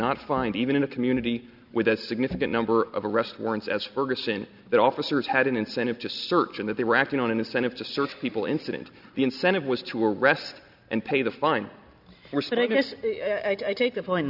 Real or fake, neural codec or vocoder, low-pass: real; none; 5.4 kHz